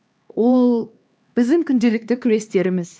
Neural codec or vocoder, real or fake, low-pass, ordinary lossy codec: codec, 16 kHz, 2 kbps, X-Codec, HuBERT features, trained on LibriSpeech; fake; none; none